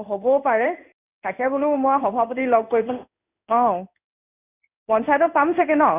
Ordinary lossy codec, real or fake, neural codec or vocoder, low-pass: none; fake; codec, 16 kHz in and 24 kHz out, 1 kbps, XY-Tokenizer; 3.6 kHz